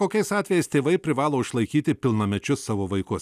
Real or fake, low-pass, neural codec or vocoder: real; 14.4 kHz; none